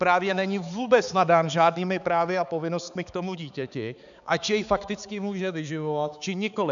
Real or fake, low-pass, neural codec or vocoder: fake; 7.2 kHz; codec, 16 kHz, 4 kbps, X-Codec, HuBERT features, trained on balanced general audio